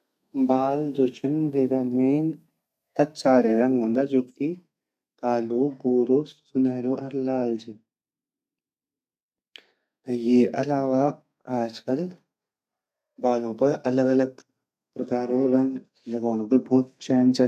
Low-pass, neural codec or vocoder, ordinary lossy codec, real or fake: 14.4 kHz; codec, 32 kHz, 1.9 kbps, SNAC; none; fake